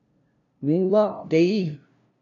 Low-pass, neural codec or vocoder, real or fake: 7.2 kHz; codec, 16 kHz, 0.5 kbps, FunCodec, trained on LibriTTS, 25 frames a second; fake